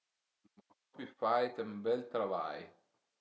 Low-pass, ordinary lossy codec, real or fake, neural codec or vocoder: none; none; real; none